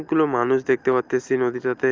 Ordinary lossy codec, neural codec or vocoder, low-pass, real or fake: Opus, 32 kbps; none; 7.2 kHz; real